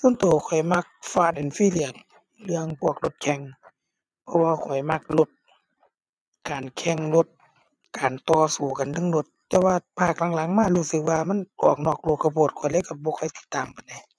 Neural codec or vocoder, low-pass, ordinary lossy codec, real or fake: vocoder, 22.05 kHz, 80 mel bands, Vocos; none; none; fake